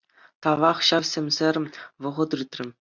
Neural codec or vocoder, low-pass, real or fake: none; 7.2 kHz; real